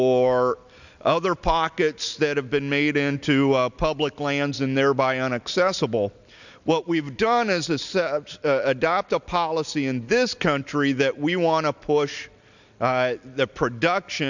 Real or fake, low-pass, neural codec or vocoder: real; 7.2 kHz; none